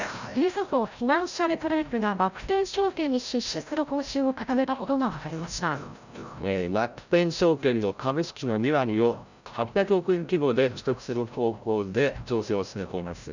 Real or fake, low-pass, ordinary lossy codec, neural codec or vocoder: fake; 7.2 kHz; none; codec, 16 kHz, 0.5 kbps, FreqCodec, larger model